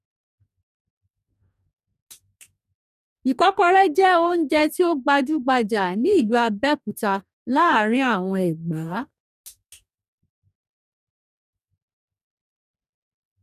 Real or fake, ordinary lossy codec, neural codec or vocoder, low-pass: fake; none; codec, 44.1 kHz, 2.6 kbps, DAC; 14.4 kHz